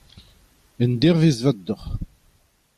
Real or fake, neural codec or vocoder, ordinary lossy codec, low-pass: fake; vocoder, 44.1 kHz, 128 mel bands every 256 samples, BigVGAN v2; MP3, 96 kbps; 14.4 kHz